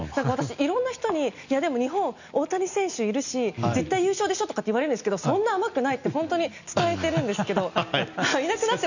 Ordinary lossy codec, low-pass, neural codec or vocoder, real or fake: none; 7.2 kHz; none; real